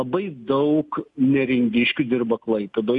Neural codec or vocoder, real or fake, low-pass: none; real; 10.8 kHz